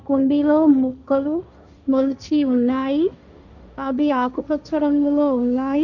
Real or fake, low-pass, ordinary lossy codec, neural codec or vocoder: fake; 7.2 kHz; none; codec, 16 kHz, 1.1 kbps, Voila-Tokenizer